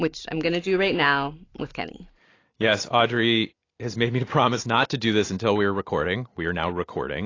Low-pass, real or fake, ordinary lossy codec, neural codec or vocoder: 7.2 kHz; real; AAC, 32 kbps; none